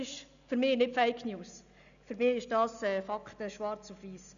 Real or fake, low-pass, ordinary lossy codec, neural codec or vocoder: real; 7.2 kHz; none; none